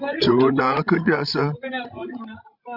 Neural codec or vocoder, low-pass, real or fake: codec, 16 kHz, 16 kbps, FreqCodec, larger model; 5.4 kHz; fake